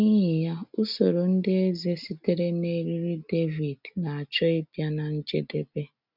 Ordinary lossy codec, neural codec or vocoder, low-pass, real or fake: none; none; 5.4 kHz; real